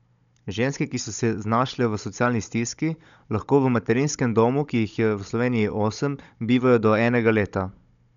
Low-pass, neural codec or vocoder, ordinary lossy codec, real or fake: 7.2 kHz; codec, 16 kHz, 16 kbps, FunCodec, trained on Chinese and English, 50 frames a second; none; fake